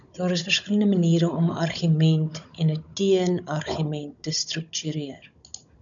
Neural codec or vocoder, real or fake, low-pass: codec, 16 kHz, 16 kbps, FunCodec, trained on Chinese and English, 50 frames a second; fake; 7.2 kHz